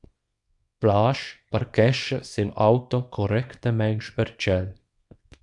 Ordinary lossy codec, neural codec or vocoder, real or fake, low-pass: AAC, 64 kbps; codec, 24 kHz, 0.9 kbps, WavTokenizer, small release; fake; 10.8 kHz